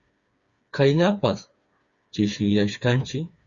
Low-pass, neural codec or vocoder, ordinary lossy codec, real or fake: 7.2 kHz; codec, 16 kHz, 4 kbps, FreqCodec, smaller model; Opus, 64 kbps; fake